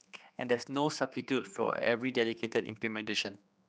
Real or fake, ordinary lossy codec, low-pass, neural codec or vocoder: fake; none; none; codec, 16 kHz, 2 kbps, X-Codec, HuBERT features, trained on general audio